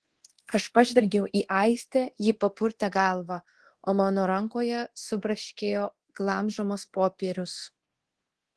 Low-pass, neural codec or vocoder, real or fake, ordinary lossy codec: 10.8 kHz; codec, 24 kHz, 0.9 kbps, DualCodec; fake; Opus, 16 kbps